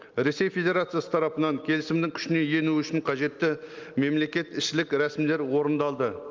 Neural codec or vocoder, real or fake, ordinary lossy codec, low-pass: none; real; Opus, 24 kbps; 7.2 kHz